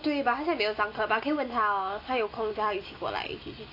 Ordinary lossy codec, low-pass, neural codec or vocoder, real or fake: AAC, 32 kbps; 5.4 kHz; none; real